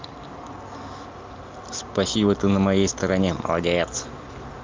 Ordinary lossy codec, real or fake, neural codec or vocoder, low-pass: Opus, 24 kbps; real; none; 7.2 kHz